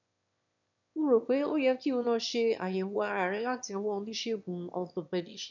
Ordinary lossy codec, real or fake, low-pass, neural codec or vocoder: none; fake; 7.2 kHz; autoencoder, 22.05 kHz, a latent of 192 numbers a frame, VITS, trained on one speaker